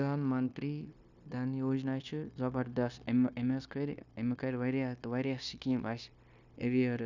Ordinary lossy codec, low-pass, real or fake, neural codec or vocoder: none; 7.2 kHz; fake; codec, 16 kHz, 0.9 kbps, LongCat-Audio-Codec